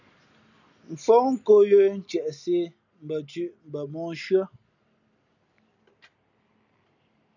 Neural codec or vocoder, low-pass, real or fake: none; 7.2 kHz; real